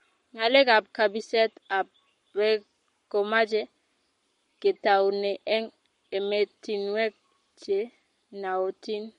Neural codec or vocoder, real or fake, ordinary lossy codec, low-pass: codec, 44.1 kHz, 7.8 kbps, Pupu-Codec; fake; MP3, 48 kbps; 19.8 kHz